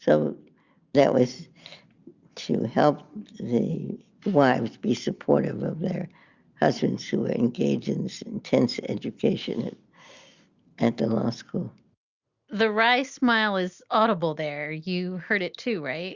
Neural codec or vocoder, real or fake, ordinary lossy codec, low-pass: none; real; Opus, 64 kbps; 7.2 kHz